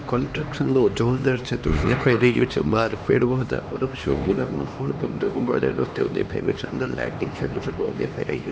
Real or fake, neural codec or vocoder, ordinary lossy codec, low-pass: fake; codec, 16 kHz, 2 kbps, X-Codec, HuBERT features, trained on LibriSpeech; none; none